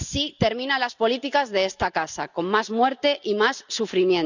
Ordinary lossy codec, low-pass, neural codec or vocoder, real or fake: none; 7.2 kHz; none; real